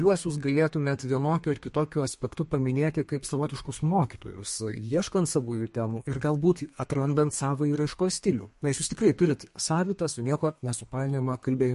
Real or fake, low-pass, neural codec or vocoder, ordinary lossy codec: fake; 14.4 kHz; codec, 32 kHz, 1.9 kbps, SNAC; MP3, 48 kbps